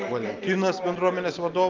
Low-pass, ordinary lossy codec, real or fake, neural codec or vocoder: 7.2 kHz; Opus, 32 kbps; real; none